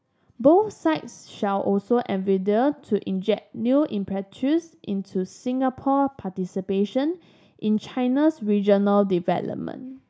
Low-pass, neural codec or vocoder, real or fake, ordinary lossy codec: none; none; real; none